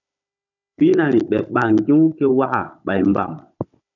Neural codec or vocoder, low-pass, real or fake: codec, 16 kHz, 16 kbps, FunCodec, trained on Chinese and English, 50 frames a second; 7.2 kHz; fake